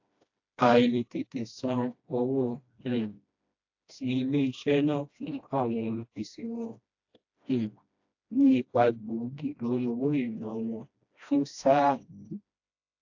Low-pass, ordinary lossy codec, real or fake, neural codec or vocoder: 7.2 kHz; AAC, 48 kbps; fake; codec, 16 kHz, 1 kbps, FreqCodec, smaller model